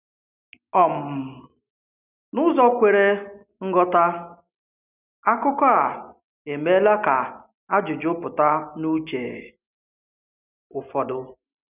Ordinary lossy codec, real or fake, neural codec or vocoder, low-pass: none; real; none; 3.6 kHz